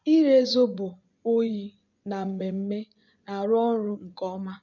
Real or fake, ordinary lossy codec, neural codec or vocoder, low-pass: fake; none; vocoder, 24 kHz, 100 mel bands, Vocos; 7.2 kHz